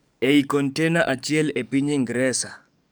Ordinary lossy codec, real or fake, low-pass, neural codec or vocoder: none; fake; none; codec, 44.1 kHz, 7.8 kbps, DAC